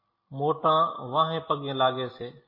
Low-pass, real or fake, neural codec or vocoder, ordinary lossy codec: 5.4 kHz; real; none; MP3, 24 kbps